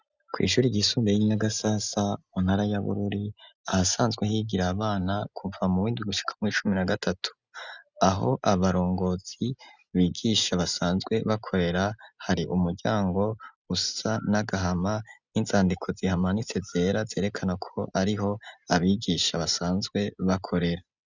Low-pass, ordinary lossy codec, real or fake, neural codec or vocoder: 7.2 kHz; Opus, 64 kbps; real; none